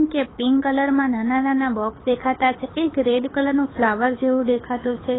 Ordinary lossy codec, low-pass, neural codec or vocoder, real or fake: AAC, 16 kbps; 7.2 kHz; codec, 24 kHz, 6 kbps, HILCodec; fake